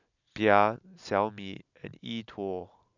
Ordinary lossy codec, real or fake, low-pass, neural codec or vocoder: none; real; 7.2 kHz; none